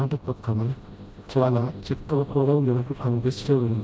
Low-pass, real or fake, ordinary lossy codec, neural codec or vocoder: none; fake; none; codec, 16 kHz, 0.5 kbps, FreqCodec, smaller model